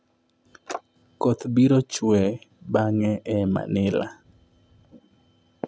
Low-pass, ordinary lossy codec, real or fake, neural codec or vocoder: none; none; real; none